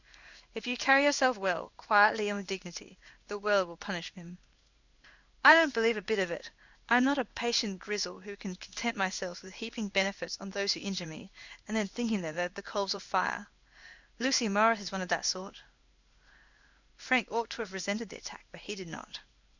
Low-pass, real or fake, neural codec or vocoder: 7.2 kHz; fake; codec, 16 kHz in and 24 kHz out, 1 kbps, XY-Tokenizer